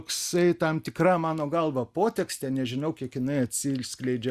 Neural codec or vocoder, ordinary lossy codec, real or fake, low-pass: none; Opus, 64 kbps; real; 14.4 kHz